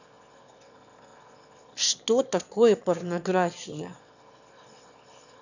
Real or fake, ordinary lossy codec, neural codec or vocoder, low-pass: fake; none; autoencoder, 22.05 kHz, a latent of 192 numbers a frame, VITS, trained on one speaker; 7.2 kHz